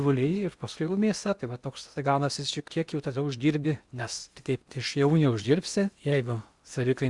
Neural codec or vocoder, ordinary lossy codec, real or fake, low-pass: codec, 16 kHz in and 24 kHz out, 0.8 kbps, FocalCodec, streaming, 65536 codes; Opus, 64 kbps; fake; 10.8 kHz